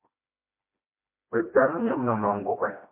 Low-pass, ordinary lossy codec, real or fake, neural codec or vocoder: 3.6 kHz; MP3, 24 kbps; fake; codec, 16 kHz, 1 kbps, FreqCodec, smaller model